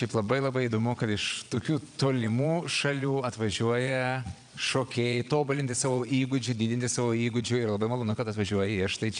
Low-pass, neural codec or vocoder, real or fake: 9.9 kHz; vocoder, 22.05 kHz, 80 mel bands, Vocos; fake